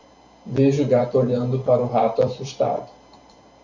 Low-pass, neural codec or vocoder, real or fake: 7.2 kHz; vocoder, 44.1 kHz, 128 mel bands every 512 samples, BigVGAN v2; fake